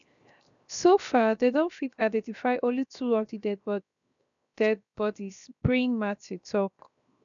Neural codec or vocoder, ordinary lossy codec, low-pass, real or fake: codec, 16 kHz, 0.7 kbps, FocalCodec; none; 7.2 kHz; fake